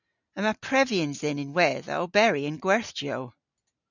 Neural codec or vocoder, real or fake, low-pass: none; real; 7.2 kHz